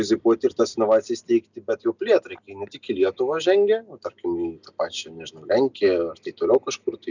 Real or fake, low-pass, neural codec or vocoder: real; 7.2 kHz; none